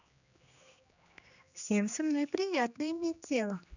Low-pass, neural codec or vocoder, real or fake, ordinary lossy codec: 7.2 kHz; codec, 16 kHz, 2 kbps, X-Codec, HuBERT features, trained on general audio; fake; none